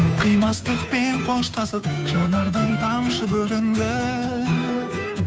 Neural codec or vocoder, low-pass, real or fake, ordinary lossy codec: codec, 16 kHz, 2 kbps, FunCodec, trained on Chinese and English, 25 frames a second; none; fake; none